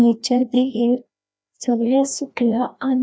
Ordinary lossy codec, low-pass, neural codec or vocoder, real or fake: none; none; codec, 16 kHz, 1 kbps, FreqCodec, larger model; fake